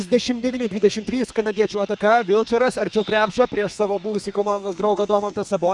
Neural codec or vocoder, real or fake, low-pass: codec, 32 kHz, 1.9 kbps, SNAC; fake; 10.8 kHz